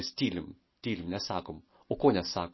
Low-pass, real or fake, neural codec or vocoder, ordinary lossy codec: 7.2 kHz; real; none; MP3, 24 kbps